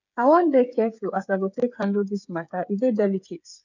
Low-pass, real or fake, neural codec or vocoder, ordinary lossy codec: 7.2 kHz; fake; codec, 16 kHz, 8 kbps, FreqCodec, smaller model; none